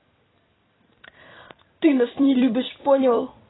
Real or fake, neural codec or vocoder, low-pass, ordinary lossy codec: fake; vocoder, 44.1 kHz, 128 mel bands every 256 samples, BigVGAN v2; 7.2 kHz; AAC, 16 kbps